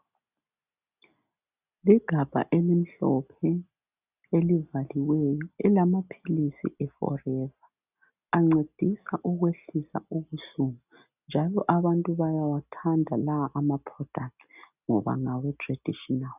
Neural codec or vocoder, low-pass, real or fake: none; 3.6 kHz; real